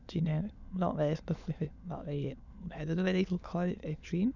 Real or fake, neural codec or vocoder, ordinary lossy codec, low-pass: fake; autoencoder, 22.05 kHz, a latent of 192 numbers a frame, VITS, trained on many speakers; none; 7.2 kHz